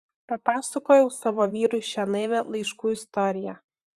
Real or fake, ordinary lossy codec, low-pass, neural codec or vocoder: fake; Opus, 64 kbps; 14.4 kHz; vocoder, 44.1 kHz, 128 mel bands, Pupu-Vocoder